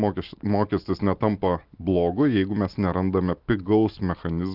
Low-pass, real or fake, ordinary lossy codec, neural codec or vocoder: 5.4 kHz; real; Opus, 32 kbps; none